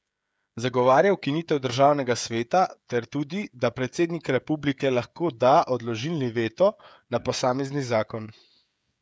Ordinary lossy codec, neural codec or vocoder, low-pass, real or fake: none; codec, 16 kHz, 16 kbps, FreqCodec, smaller model; none; fake